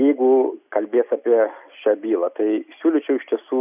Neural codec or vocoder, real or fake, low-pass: none; real; 3.6 kHz